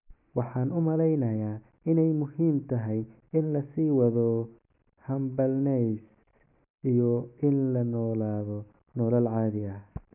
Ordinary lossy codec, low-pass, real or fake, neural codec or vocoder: none; 3.6 kHz; real; none